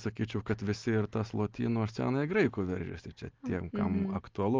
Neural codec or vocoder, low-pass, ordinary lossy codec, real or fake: none; 7.2 kHz; Opus, 24 kbps; real